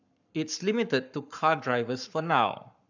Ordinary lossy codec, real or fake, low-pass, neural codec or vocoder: none; fake; 7.2 kHz; codec, 44.1 kHz, 7.8 kbps, Pupu-Codec